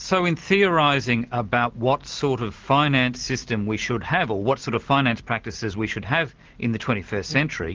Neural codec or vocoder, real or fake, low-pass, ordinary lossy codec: none; real; 7.2 kHz; Opus, 16 kbps